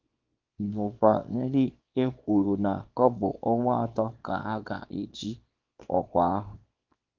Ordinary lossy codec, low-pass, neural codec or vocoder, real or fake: Opus, 32 kbps; 7.2 kHz; codec, 24 kHz, 0.9 kbps, WavTokenizer, small release; fake